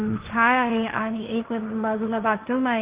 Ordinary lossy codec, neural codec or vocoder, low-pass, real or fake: Opus, 32 kbps; codec, 16 kHz, 1.1 kbps, Voila-Tokenizer; 3.6 kHz; fake